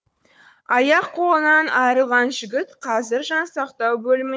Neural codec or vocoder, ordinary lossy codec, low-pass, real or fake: codec, 16 kHz, 4 kbps, FunCodec, trained on Chinese and English, 50 frames a second; none; none; fake